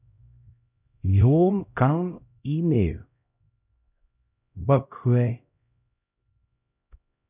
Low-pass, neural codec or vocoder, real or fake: 3.6 kHz; codec, 16 kHz, 0.5 kbps, X-Codec, HuBERT features, trained on LibriSpeech; fake